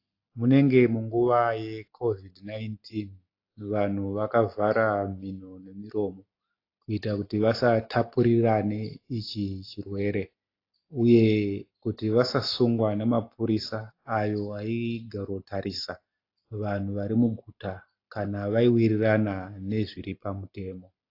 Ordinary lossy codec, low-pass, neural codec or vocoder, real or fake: AAC, 32 kbps; 5.4 kHz; none; real